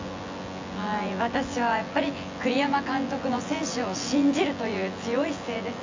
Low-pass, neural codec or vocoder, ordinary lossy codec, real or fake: 7.2 kHz; vocoder, 24 kHz, 100 mel bands, Vocos; AAC, 48 kbps; fake